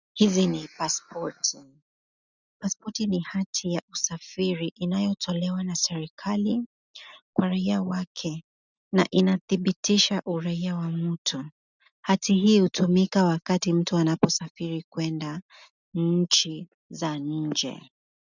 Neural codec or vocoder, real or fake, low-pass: none; real; 7.2 kHz